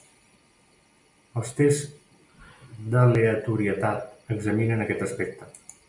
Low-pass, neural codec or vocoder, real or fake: 10.8 kHz; none; real